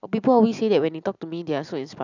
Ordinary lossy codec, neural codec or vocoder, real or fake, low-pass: none; none; real; 7.2 kHz